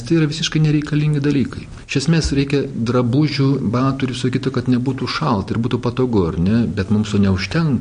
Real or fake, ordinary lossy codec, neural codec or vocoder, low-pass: real; MP3, 48 kbps; none; 9.9 kHz